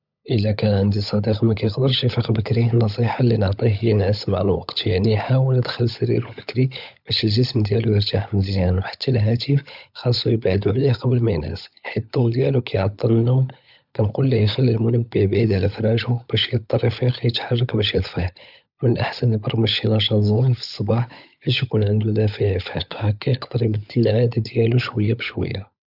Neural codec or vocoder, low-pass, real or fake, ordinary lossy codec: codec, 16 kHz, 16 kbps, FunCodec, trained on LibriTTS, 50 frames a second; 5.4 kHz; fake; none